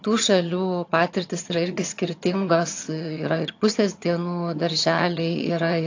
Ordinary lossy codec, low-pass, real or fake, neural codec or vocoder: AAC, 48 kbps; 7.2 kHz; fake; vocoder, 22.05 kHz, 80 mel bands, HiFi-GAN